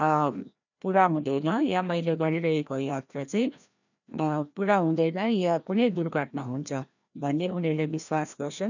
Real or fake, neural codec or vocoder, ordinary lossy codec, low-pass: fake; codec, 16 kHz, 1 kbps, FreqCodec, larger model; none; 7.2 kHz